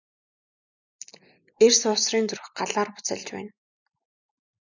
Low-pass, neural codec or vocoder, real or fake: 7.2 kHz; none; real